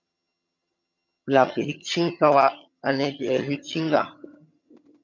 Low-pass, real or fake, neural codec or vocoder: 7.2 kHz; fake; vocoder, 22.05 kHz, 80 mel bands, HiFi-GAN